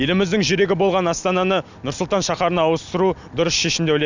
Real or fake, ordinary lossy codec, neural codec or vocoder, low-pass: real; none; none; 7.2 kHz